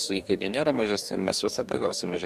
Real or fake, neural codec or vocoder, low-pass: fake; codec, 44.1 kHz, 2.6 kbps, DAC; 14.4 kHz